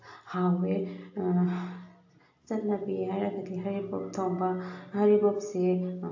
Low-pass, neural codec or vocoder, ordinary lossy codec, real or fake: 7.2 kHz; none; AAC, 48 kbps; real